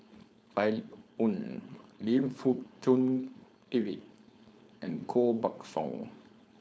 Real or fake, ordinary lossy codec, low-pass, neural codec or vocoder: fake; none; none; codec, 16 kHz, 4.8 kbps, FACodec